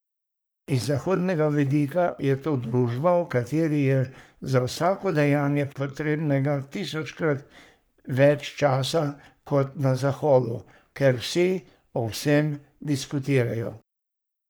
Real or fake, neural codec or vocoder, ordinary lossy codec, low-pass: fake; codec, 44.1 kHz, 3.4 kbps, Pupu-Codec; none; none